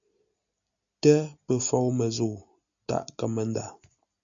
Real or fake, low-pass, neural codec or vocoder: real; 7.2 kHz; none